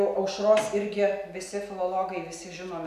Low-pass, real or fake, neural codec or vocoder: 14.4 kHz; real; none